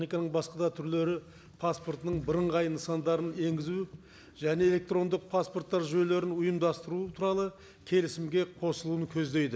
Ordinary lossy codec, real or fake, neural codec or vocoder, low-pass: none; real; none; none